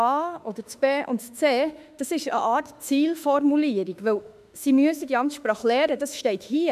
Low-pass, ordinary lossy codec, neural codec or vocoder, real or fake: 14.4 kHz; none; autoencoder, 48 kHz, 32 numbers a frame, DAC-VAE, trained on Japanese speech; fake